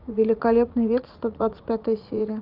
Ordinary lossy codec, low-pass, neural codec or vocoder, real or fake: Opus, 32 kbps; 5.4 kHz; vocoder, 44.1 kHz, 80 mel bands, Vocos; fake